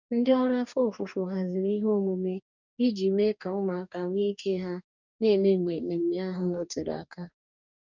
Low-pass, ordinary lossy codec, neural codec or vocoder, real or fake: 7.2 kHz; none; codec, 44.1 kHz, 2.6 kbps, DAC; fake